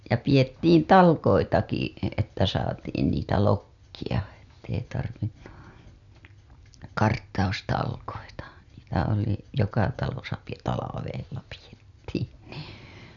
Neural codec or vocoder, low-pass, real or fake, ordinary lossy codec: none; 7.2 kHz; real; none